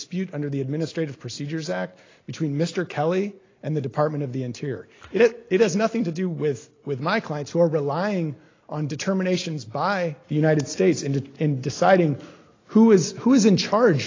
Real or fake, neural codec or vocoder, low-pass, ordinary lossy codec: real; none; 7.2 kHz; AAC, 32 kbps